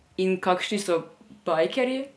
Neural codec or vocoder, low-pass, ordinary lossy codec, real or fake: none; none; none; real